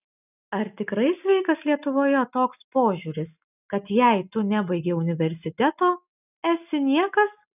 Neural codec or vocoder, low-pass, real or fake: none; 3.6 kHz; real